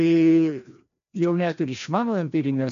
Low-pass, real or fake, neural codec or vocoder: 7.2 kHz; fake; codec, 16 kHz, 1 kbps, FreqCodec, larger model